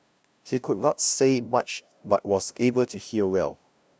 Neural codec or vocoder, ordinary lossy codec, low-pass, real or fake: codec, 16 kHz, 0.5 kbps, FunCodec, trained on LibriTTS, 25 frames a second; none; none; fake